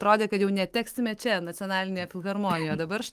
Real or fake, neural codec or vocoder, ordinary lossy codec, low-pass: fake; autoencoder, 48 kHz, 128 numbers a frame, DAC-VAE, trained on Japanese speech; Opus, 24 kbps; 14.4 kHz